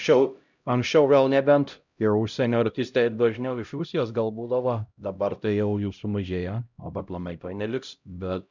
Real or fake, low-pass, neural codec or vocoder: fake; 7.2 kHz; codec, 16 kHz, 0.5 kbps, X-Codec, HuBERT features, trained on LibriSpeech